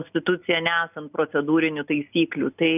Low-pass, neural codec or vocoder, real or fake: 3.6 kHz; none; real